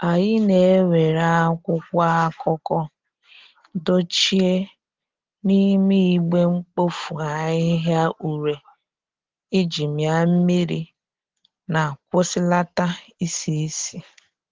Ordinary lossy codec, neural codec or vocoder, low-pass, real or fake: Opus, 16 kbps; none; 7.2 kHz; real